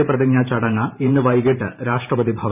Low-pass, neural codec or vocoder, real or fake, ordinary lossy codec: 3.6 kHz; vocoder, 44.1 kHz, 128 mel bands every 512 samples, BigVGAN v2; fake; none